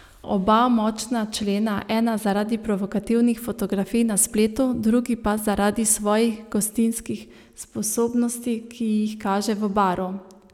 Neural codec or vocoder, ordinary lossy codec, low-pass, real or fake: none; none; 19.8 kHz; real